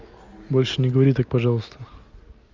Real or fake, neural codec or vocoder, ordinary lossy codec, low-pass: real; none; Opus, 32 kbps; 7.2 kHz